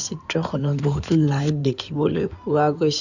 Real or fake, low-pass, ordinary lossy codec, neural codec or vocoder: fake; 7.2 kHz; none; codec, 16 kHz in and 24 kHz out, 2.2 kbps, FireRedTTS-2 codec